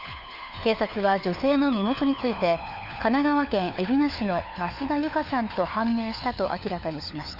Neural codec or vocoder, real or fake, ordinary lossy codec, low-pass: codec, 16 kHz, 4 kbps, FunCodec, trained on LibriTTS, 50 frames a second; fake; none; 5.4 kHz